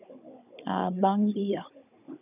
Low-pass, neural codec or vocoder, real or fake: 3.6 kHz; codec, 16 kHz, 16 kbps, FunCodec, trained on Chinese and English, 50 frames a second; fake